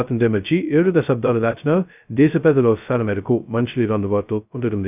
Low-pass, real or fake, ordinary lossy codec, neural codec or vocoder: 3.6 kHz; fake; none; codec, 16 kHz, 0.2 kbps, FocalCodec